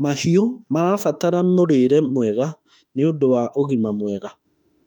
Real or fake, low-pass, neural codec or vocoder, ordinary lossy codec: fake; 19.8 kHz; autoencoder, 48 kHz, 32 numbers a frame, DAC-VAE, trained on Japanese speech; none